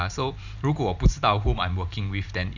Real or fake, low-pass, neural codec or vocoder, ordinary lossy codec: fake; 7.2 kHz; vocoder, 44.1 kHz, 128 mel bands every 512 samples, BigVGAN v2; none